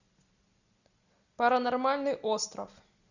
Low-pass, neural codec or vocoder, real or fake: 7.2 kHz; none; real